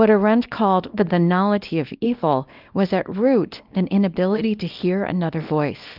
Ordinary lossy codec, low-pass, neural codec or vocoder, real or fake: Opus, 32 kbps; 5.4 kHz; codec, 24 kHz, 0.9 kbps, WavTokenizer, small release; fake